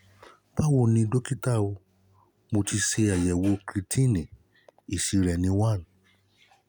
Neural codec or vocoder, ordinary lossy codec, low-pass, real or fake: none; none; 19.8 kHz; real